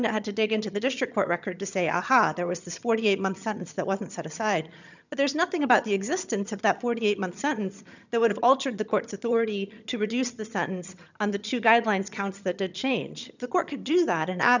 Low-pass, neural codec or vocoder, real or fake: 7.2 kHz; vocoder, 22.05 kHz, 80 mel bands, HiFi-GAN; fake